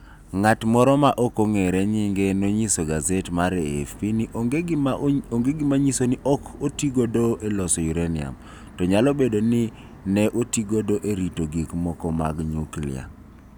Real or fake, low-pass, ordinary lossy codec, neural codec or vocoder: real; none; none; none